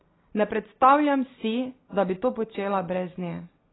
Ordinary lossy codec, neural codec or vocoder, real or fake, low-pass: AAC, 16 kbps; none; real; 7.2 kHz